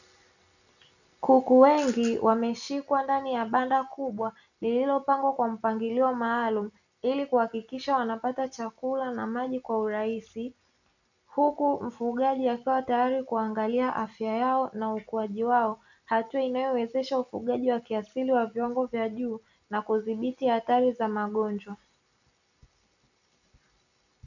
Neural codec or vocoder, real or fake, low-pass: none; real; 7.2 kHz